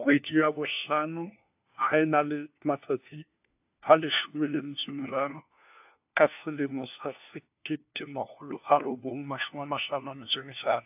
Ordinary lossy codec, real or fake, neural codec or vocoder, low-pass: AAC, 32 kbps; fake; codec, 16 kHz, 1 kbps, FunCodec, trained on LibriTTS, 50 frames a second; 3.6 kHz